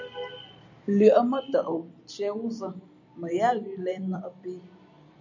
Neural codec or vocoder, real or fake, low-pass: vocoder, 44.1 kHz, 128 mel bands every 256 samples, BigVGAN v2; fake; 7.2 kHz